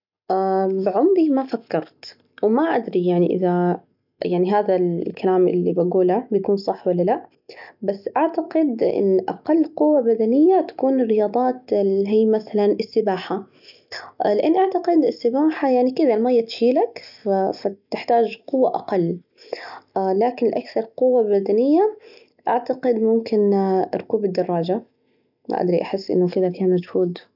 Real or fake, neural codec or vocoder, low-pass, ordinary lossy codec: real; none; 5.4 kHz; none